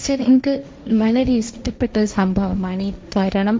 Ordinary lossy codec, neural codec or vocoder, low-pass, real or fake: none; codec, 16 kHz, 1.1 kbps, Voila-Tokenizer; none; fake